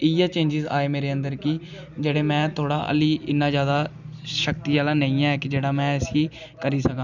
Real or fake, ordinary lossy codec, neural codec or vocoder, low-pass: real; none; none; 7.2 kHz